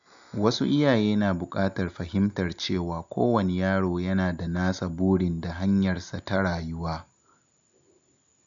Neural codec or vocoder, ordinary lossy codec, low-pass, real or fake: none; none; 7.2 kHz; real